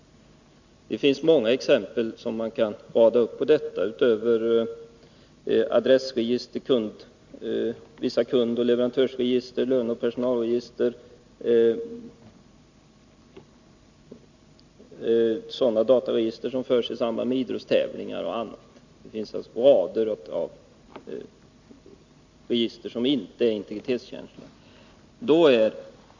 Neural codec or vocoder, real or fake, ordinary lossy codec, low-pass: none; real; Opus, 64 kbps; 7.2 kHz